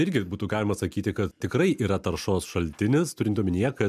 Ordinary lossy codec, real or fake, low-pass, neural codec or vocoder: MP3, 96 kbps; real; 14.4 kHz; none